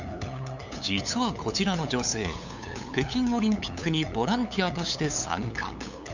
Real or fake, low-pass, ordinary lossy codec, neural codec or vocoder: fake; 7.2 kHz; none; codec, 16 kHz, 8 kbps, FunCodec, trained on LibriTTS, 25 frames a second